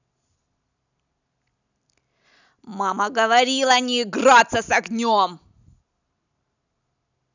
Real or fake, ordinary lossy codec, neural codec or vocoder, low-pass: real; none; none; 7.2 kHz